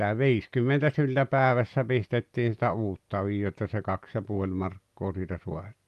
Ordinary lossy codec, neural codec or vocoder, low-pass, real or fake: Opus, 32 kbps; none; 14.4 kHz; real